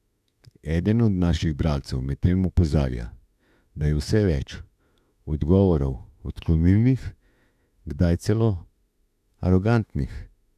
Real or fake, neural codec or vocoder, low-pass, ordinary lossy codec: fake; autoencoder, 48 kHz, 32 numbers a frame, DAC-VAE, trained on Japanese speech; 14.4 kHz; none